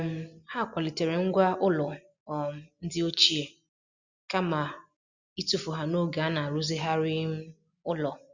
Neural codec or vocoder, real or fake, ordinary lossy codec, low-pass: none; real; Opus, 64 kbps; 7.2 kHz